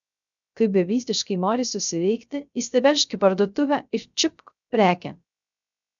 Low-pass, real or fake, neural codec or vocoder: 7.2 kHz; fake; codec, 16 kHz, 0.3 kbps, FocalCodec